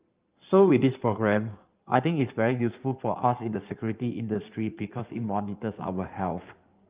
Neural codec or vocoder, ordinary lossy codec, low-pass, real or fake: codec, 16 kHz in and 24 kHz out, 2.2 kbps, FireRedTTS-2 codec; Opus, 32 kbps; 3.6 kHz; fake